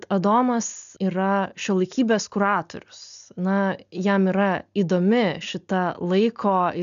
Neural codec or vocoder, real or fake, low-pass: none; real; 7.2 kHz